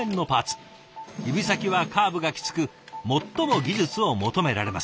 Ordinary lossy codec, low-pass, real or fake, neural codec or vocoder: none; none; real; none